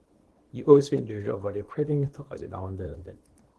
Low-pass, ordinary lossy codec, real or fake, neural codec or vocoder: 10.8 kHz; Opus, 16 kbps; fake; codec, 24 kHz, 0.9 kbps, WavTokenizer, small release